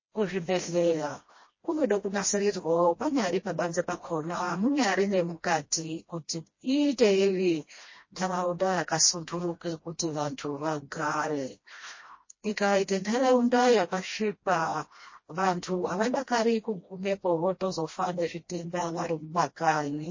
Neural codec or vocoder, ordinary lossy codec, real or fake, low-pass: codec, 16 kHz, 1 kbps, FreqCodec, smaller model; MP3, 32 kbps; fake; 7.2 kHz